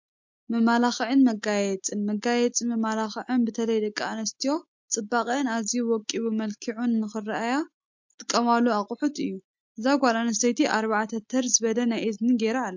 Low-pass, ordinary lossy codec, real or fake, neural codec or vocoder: 7.2 kHz; MP3, 48 kbps; real; none